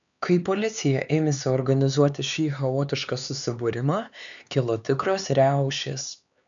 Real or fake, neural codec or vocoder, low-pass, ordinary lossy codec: fake; codec, 16 kHz, 4 kbps, X-Codec, HuBERT features, trained on LibriSpeech; 7.2 kHz; MP3, 96 kbps